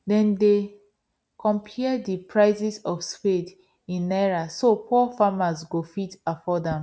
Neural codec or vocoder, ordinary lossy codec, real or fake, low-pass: none; none; real; none